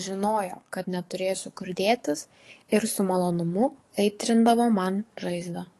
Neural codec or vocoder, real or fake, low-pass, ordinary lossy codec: codec, 44.1 kHz, 7.8 kbps, DAC; fake; 14.4 kHz; AAC, 48 kbps